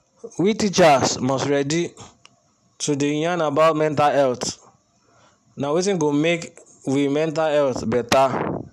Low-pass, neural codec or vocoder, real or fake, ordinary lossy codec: 14.4 kHz; none; real; none